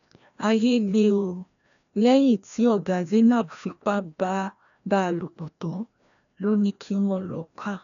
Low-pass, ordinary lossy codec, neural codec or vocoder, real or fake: 7.2 kHz; none; codec, 16 kHz, 1 kbps, FreqCodec, larger model; fake